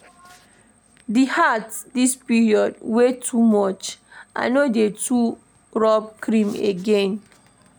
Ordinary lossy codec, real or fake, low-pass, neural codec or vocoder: none; real; none; none